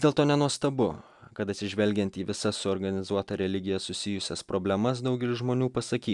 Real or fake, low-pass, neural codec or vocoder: real; 10.8 kHz; none